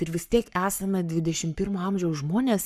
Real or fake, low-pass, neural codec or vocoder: fake; 14.4 kHz; codec, 44.1 kHz, 7.8 kbps, Pupu-Codec